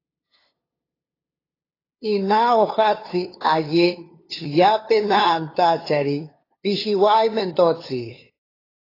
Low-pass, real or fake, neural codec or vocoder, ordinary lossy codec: 5.4 kHz; fake; codec, 16 kHz, 2 kbps, FunCodec, trained on LibriTTS, 25 frames a second; AAC, 24 kbps